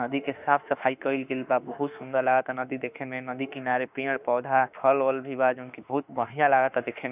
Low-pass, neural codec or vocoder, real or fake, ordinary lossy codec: 3.6 kHz; autoencoder, 48 kHz, 32 numbers a frame, DAC-VAE, trained on Japanese speech; fake; none